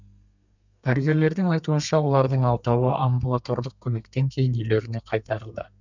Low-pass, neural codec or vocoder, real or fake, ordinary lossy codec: 7.2 kHz; codec, 32 kHz, 1.9 kbps, SNAC; fake; none